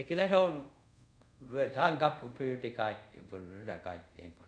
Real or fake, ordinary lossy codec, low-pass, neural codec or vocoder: fake; none; 9.9 kHz; codec, 24 kHz, 0.5 kbps, DualCodec